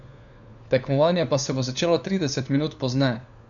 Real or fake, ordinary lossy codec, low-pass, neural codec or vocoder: fake; none; 7.2 kHz; codec, 16 kHz, 4 kbps, FunCodec, trained on LibriTTS, 50 frames a second